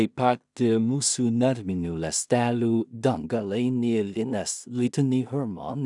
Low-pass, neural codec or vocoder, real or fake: 10.8 kHz; codec, 16 kHz in and 24 kHz out, 0.4 kbps, LongCat-Audio-Codec, two codebook decoder; fake